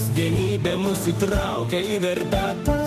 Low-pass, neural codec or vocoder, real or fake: 14.4 kHz; autoencoder, 48 kHz, 32 numbers a frame, DAC-VAE, trained on Japanese speech; fake